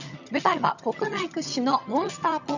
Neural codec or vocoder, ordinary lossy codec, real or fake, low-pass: vocoder, 22.05 kHz, 80 mel bands, HiFi-GAN; none; fake; 7.2 kHz